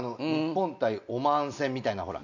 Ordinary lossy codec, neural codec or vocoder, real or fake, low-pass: none; none; real; 7.2 kHz